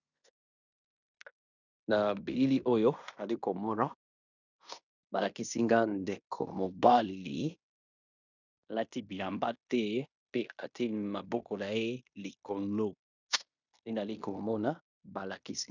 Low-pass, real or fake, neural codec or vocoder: 7.2 kHz; fake; codec, 16 kHz in and 24 kHz out, 0.9 kbps, LongCat-Audio-Codec, fine tuned four codebook decoder